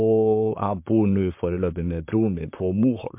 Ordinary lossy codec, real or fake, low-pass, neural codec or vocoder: MP3, 32 kbps; fake; 3.6 kHz; vocoder, 44.1 kHz, 128 mel bands, Pupu-Vocoder